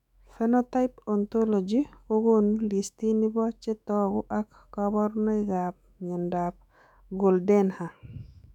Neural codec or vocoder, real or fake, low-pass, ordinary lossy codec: autoencoder, 48 kHz, 128 numbers a frame, DAC-VAE, trained on Japanese speech; fake; 19.8 kHz; none